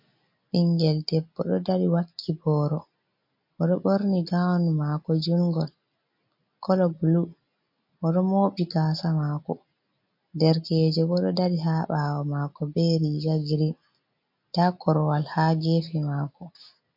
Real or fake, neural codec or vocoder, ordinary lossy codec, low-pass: real; none; MP3, 32 kbps; 5.4 kHz